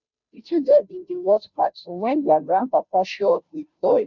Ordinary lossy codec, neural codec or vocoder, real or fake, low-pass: none; codec, 16 kHz, 0.5 kbps, FunCodec, trained on Chinese and English, 25 frames a second; fake; 7.2 kHz